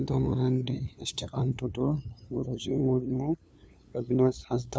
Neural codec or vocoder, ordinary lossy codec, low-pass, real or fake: codec, 16 kHz, 2 kbps, FunCodec, trained on LibriTTS, 25 frames a second; none; none; fake